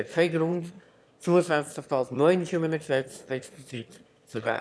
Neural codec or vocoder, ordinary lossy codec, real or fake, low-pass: autoencoder, 22.05 kHz, a latent of 192 numbers a frame, VITS, trained on one speaker; none; fake; none